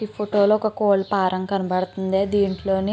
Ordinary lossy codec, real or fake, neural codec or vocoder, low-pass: none; real; none; none